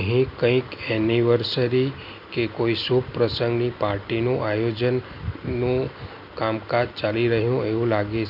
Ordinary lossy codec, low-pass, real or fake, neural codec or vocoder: none; 5.4 kHz; real; none